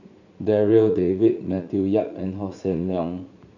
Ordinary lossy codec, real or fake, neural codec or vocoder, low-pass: none; fake; vocoder, 44.1 kHz, 80 mel bands, Vocos; 7.2 kHz